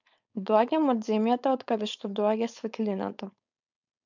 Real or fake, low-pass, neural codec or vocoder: fake; 7.2 kHz; codec, 16 kHz, 4.8 kbps, FACodec